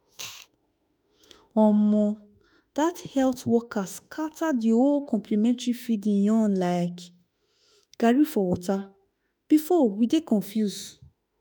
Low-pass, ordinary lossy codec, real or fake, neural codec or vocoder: none; none; fake; autoencoder, 48 kHz, 32 numbers a frame, DAC-VAE, trained on Japanese speech